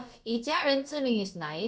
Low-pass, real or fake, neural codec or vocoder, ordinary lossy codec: none; fake; codec, 16 kHz, about 1 kbps, DyCAST, with the encoder's durations; none